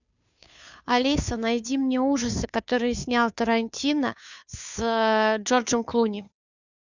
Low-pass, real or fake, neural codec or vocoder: 7.2 kHz; fake; codec, 16 kHz, 2 kbps, FunCodec, trained on Chinese and English, 25 frames a second